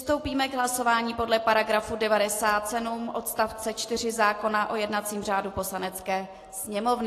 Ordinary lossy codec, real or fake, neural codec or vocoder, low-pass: AAC, 48 kbps; real; none; 14.4 kHz